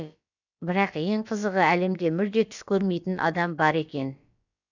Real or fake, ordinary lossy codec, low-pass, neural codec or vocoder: fake; none; 7.2 kHz; codec, 16 kHz, about 1 kbps, DyCAST, with the encoder's durations